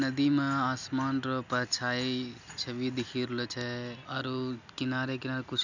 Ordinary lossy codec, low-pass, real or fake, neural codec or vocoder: none; 7.2 kHz; real; none